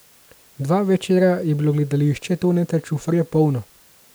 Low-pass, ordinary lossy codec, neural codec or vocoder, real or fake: none; none; none; real